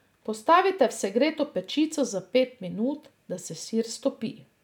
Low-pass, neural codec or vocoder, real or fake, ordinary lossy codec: 19.8 kHz; none; real; none